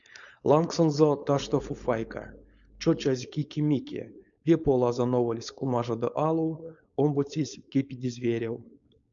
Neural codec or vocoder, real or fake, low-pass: codec, 16 kHz, 4.8 kbps, FACodec; fake; 7.2 kHz